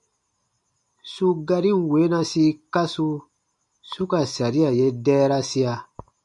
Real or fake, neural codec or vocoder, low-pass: real; none; 10.8 kHz